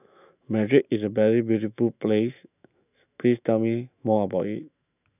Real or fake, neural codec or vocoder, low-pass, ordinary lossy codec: fake; autoencoder, 48 kHz, 128 numbers a frame, DAC-VAE, trained on Japanese speech; 3.6 kHz; none